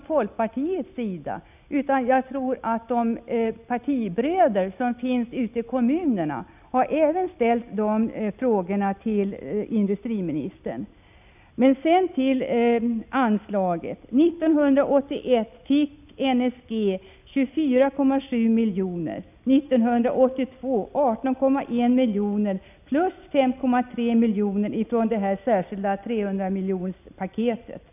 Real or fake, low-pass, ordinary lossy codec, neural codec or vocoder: real; 3.6 kHz; none; none